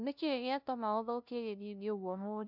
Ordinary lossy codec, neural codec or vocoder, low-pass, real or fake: none; codec, 16 kHz, 0.5 kbps, FunCodec, trained on LibriTTS, 25 frames a second; 5.4 kHz; fake